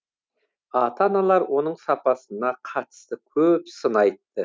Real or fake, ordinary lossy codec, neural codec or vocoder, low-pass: real; none; none; none